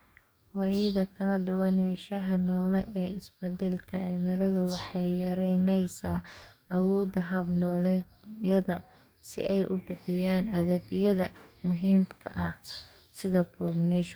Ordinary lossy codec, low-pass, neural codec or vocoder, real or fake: none; none; codec, 44.1 kHz, 2.6 kbps, DAC; fake